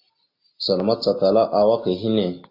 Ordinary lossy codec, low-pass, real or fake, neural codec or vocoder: AAC, 32 kbps; 5.4 kHz; real; none